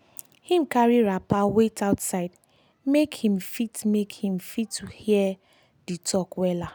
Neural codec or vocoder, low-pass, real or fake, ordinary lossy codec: none; none; real; none